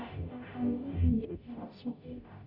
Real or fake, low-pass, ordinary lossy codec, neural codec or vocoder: fake; 5.4 kHz; none; codec, 44.1 kHz, 0.9 kbps, DAC